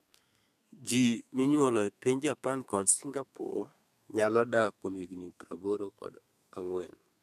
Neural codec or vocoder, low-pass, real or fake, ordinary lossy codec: codec, 32 kHz, 1.9 kbps, SNAC; 14.4 kHz; fake; none